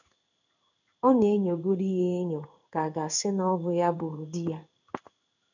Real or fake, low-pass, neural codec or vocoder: fake; 7.2 kHz; codec, 16 kHz in and 24 kHz out, 1 kbps, XY-Tokenizer